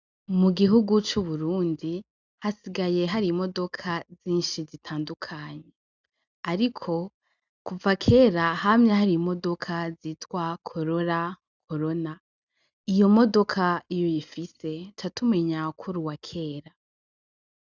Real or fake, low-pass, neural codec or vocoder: real; 7.2 kHz; none